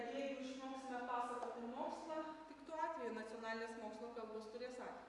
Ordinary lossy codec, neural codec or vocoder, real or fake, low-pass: AAC, 48 kbps; none; real; 10.8 kHz